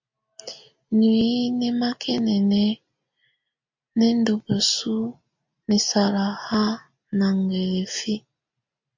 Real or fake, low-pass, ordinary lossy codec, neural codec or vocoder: real; 7.2 kHz; MP3, 48 kbps; none